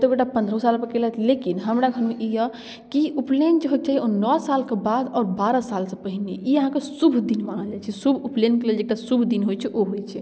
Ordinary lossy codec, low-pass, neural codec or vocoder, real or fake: none; none; none; real